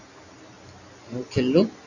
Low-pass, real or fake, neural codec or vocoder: 7.2 kHz; real; none